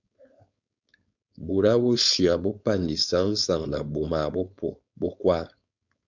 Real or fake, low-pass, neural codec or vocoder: fake; 7.2 kHz; codec, 16 kHz, 4.8 kbps, FACodec